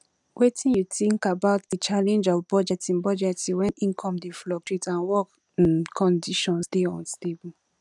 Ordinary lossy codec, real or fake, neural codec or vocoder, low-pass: none; real; none; 10.8 kHz